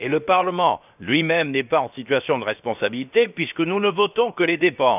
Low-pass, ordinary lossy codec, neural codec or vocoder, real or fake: 3.6 kHz; none; codec, 16 kHz, about 1 kbps, DyCAST, with the encoder's durations; fake